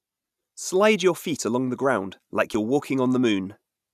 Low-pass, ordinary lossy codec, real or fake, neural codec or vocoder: 14.4 kHz; none; real; none